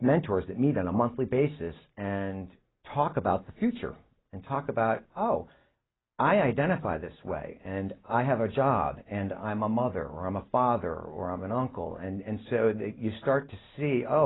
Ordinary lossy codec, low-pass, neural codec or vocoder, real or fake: AAC, 16 kbps; 7.2 kHz; none; real